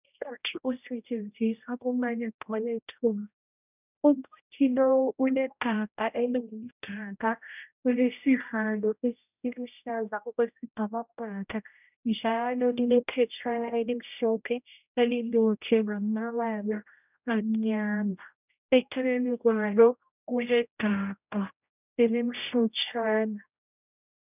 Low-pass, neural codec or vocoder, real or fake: 3.6 kHz; codec, 16 kHz, 0.5 kbps, X-Codec, HuBERT features, trained on general audio; fake